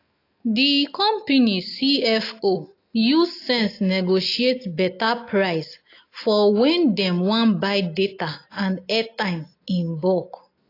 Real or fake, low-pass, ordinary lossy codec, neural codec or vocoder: real; 5.4 kHz; AAC, 32 kbps; none